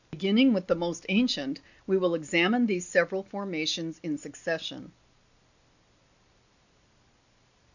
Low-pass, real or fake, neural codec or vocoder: 7.2 kHz; real; none